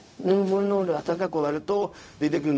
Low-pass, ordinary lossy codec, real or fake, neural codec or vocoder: none; none; fake; codec, 16 kHz, 0.4 kbps, LongCat-Audio-Codec